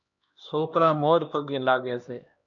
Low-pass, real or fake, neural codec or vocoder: 7.2 kHz; fake; codec, 16 kHz, 1 kbps, X-Codec, HuBERT features, trained on LibriSpeech